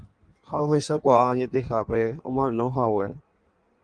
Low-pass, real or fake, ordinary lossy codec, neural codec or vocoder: 9.9 kHz; fake; Opus, 24 kbps; codec, 16 kHz in and 24 kHz out, 1.1 kbps, FireRedTTS-2 codec